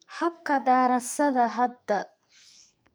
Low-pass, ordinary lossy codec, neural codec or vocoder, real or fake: none; none; codec, 44.1 kHz, 2.6 kbps, SNAC; fake